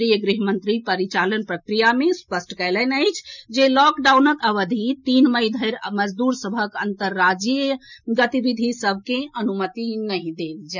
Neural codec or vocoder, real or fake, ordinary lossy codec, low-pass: none; real; none; 7.2 kHz